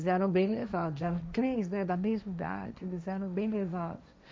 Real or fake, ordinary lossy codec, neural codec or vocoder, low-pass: fake; none; codec, 16 kHz, 1.1 kbps, Voila-Tokenizer; none